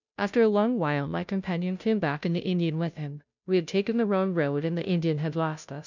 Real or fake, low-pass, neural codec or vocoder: fake; 7.2 kHz; codec, 16 kHz, 0.5 kbps, FunCodec, trained on Chinese and English, 25 frames a second